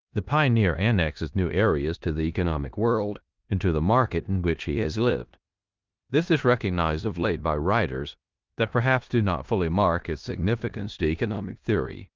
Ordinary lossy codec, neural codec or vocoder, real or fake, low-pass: Opus, 24 kbps; codec, 16 kHz in and 24 kHz out, 0.9 kbps, LongCat-Audio-Codec, four codebook decoder; fake; 7.2 kHz